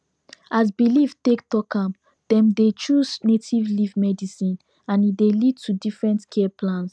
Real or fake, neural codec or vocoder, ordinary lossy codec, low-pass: real; none; none; none